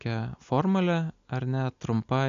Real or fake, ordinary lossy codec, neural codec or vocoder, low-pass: real; MP3, 64 kbps; none; 7.2 kHz